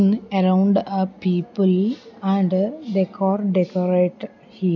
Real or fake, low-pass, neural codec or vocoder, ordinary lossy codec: real; 7.2 kHz; none; none